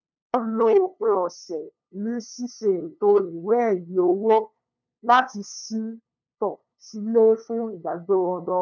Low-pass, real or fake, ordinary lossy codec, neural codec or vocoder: 7.2 kHz; fake; none; codec, 16 kHz, 2 kbps, FunCodec, trained on LibriTTS, 25 frames a second